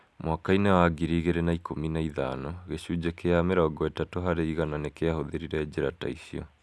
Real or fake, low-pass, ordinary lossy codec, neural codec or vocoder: real; none; none; none